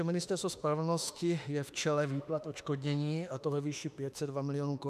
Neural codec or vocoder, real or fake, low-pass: autoencoder, 48 kHz, 32 numbers a frame, DAC-VAE, trained on Japanese speech; fake; 14.4 kHz